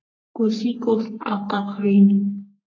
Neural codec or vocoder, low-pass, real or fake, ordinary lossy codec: codec, 44.1 kHz, 3.4 kbps, Pupu-Codec; 7.2 kHz; fake; AAC, 32 kbps